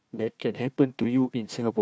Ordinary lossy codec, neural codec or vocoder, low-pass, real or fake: none; codec, 16 kHz, 1 kbps, FunCodec, trained on Chinese and English, 50 frames a second; none; fake